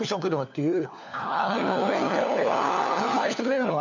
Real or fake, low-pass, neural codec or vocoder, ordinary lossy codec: fake; 7.2 kHz; codec, 16 kHz, 4 kbps, FunCodec, trained on LibriTTS, 50 frames a second; none